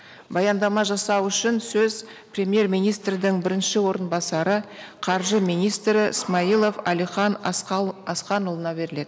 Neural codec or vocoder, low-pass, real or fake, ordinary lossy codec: none; none; real; none